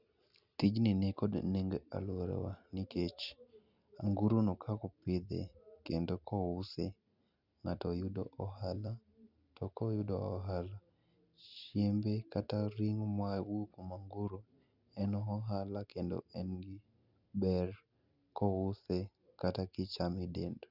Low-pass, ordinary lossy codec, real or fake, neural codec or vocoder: 5.4 kHz; none; real; none